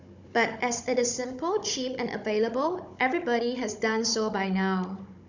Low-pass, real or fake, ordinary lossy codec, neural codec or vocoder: 7.2 kHz; fake; none; codec, 16 kHz, 16 kbps, FunCodec, trained on Chinese and English, 50 frames a second